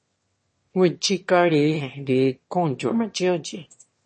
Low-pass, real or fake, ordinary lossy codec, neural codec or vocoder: 9.9 kHz; fake; MP3, 32 kbps; autoencoder, 22.05 kHz, a latent of 192 numbers a frame, VITS, trained on one speaker